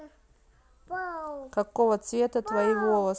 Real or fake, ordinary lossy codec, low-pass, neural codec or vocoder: real; none; none; none